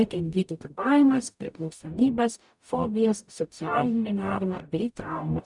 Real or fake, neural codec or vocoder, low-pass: fake; codec, 44.1 kHz, 0.9 kbps, DAC; 10.8 kHz